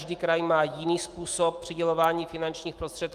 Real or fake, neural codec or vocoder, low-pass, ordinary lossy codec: fake; autoencoder, 48 kHz, 128 numbers a frame, DAC-VAE, trained on Japanese speech; 14.4 kHz; Opus, 32 kbps